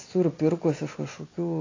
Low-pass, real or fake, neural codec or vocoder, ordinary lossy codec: 7.2 kHz; real; none; AAC, 32 kbps